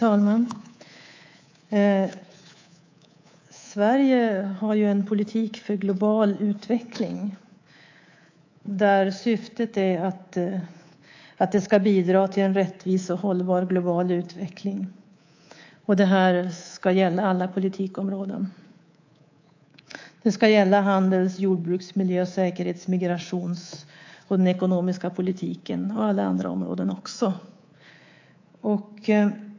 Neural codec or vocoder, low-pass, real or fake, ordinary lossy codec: codec, 24 kHz, 3.1 kbps, DualCodec; 7.2 kHz; fake; AAC, 48 kbps